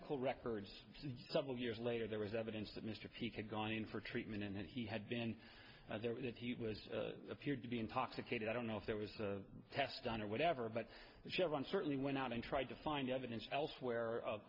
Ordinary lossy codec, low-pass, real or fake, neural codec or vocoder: MP3, 48 kbps; 5.4 kHz; real; none